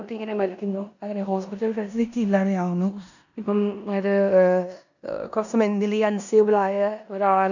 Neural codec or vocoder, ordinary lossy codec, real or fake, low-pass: codec, 16 kHz in and 24 kHz out, 0.9 kbps, LongCat-Audio-Codec, four codebook decoder; none; fake; 7.2 kHz